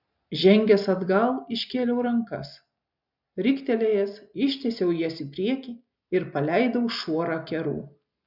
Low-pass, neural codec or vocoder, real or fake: 5.4 kHz; none; real